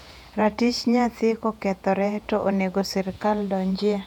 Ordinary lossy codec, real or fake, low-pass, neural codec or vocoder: none; fake; 19.8 kHz; vocoder, 48 kHz, 128 mel bands, Vocos